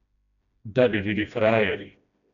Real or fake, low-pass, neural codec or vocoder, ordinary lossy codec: fake; 7.2 kHz; codec, 16 kHz, 1 kbps, FreqCodec, smaller model; none